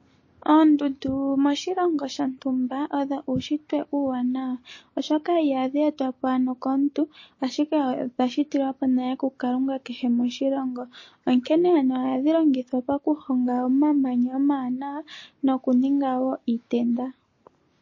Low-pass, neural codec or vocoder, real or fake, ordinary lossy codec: 7.2 kHz; none; real; MP3, 32 kbps